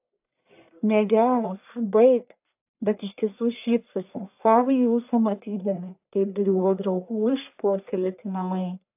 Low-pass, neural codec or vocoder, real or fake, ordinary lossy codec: 3.6 kHz; codec, 44.1 kHz, 1.7 kbps, Pupu-Codec; fake; AAC, 32 kbps